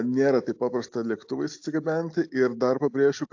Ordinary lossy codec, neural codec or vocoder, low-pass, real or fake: AAC, 48 kbps; none; 7.2 kHz; real